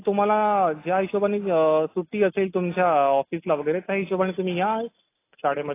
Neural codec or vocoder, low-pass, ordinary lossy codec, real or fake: none; 3.6 kHz; AAC, 24 kbps; real